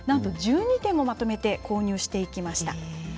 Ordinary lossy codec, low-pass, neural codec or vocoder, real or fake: none; none; none; real